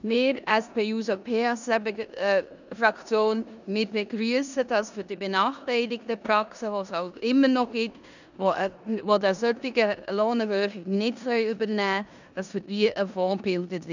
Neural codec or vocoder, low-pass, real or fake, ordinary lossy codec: codec, 16 kHz in and 24 kHz out, 0.9 kbps, LongCat-Audio-Codec, four codebook decoder; 7.2 kHz; fake; none